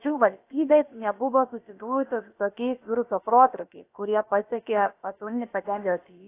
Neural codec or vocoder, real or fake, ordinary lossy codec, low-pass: codec, 16 kHz, about 1 kbps, DyCAST, with the encoder's durations; fake; AAC, 24 kbps; 3.6 kHz